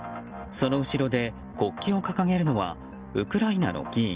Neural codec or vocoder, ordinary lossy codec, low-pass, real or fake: none; Opus, 32 kbps; 3.6 kHz; real